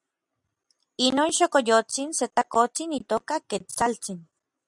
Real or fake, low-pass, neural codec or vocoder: real; 10.8 kHz; none